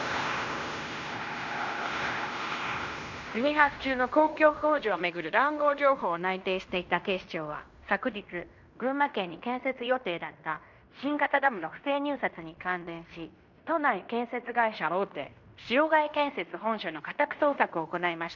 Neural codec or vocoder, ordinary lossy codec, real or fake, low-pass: codec, 16 kHz in and 24 kHz out, 0.9 kbps, LongCat-Audio-Codec, fine tuned four codebook decoder; none; fake; 7.2 kHz